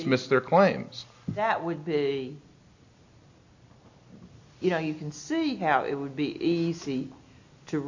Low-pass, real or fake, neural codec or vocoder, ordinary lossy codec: 7.2 kHz; real; none; AAC, 48 kbps